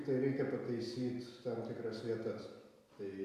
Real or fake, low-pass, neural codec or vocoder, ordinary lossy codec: real; 14.4 kHz; none; Opus, 64 kbps